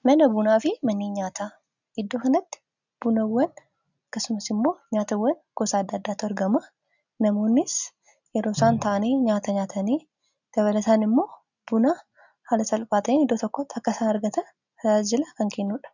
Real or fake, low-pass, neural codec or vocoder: real; 7.2 kHz; none